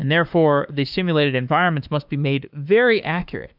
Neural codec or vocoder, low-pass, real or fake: autoencoder, 48 kHz, 32 numbers a frame, DAC-VAE, trained on Japanese speech; 5.4 kHz; fake